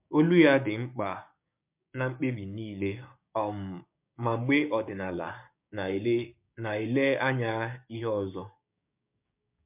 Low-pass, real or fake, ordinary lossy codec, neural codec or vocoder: 3.6 kHz; fake; MP3, 32 kbps; codec, 16 kHz, 6 kbps, DAC